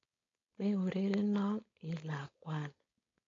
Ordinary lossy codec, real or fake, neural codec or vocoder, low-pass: AAC, 32 kbps; fake; codec, 16 kHz, 4.8 kbps, FACodec; 7.2 kHz